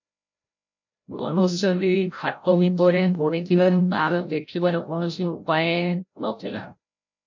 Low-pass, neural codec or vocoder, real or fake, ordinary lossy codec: 7.2 kHz; codec, 16 kHz, 0.5 kbps, FreqCodec, larger model; fake; MP3, 48 kbps